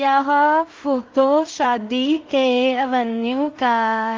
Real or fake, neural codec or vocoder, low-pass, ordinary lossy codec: fake; codec, 16 kHz in and 24 kHz out, 0.4 kbps, LongCat-Audio-Codec, two codebook decoder; 7.2 kHz; Opus, 32 kbps